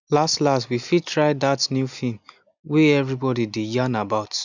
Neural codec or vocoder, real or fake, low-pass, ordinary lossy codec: none; real; 7.2 kHz; none